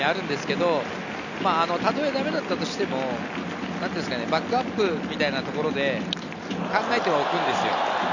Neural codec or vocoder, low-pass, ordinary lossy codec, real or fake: none; 7.2 kHz; none; real